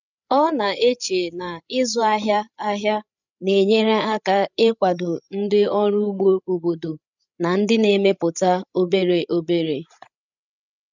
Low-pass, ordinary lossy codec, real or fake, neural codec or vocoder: 7.2 kHz; none; fake; codec, 16 kHz, 16 kbps, FreqCodec, larger model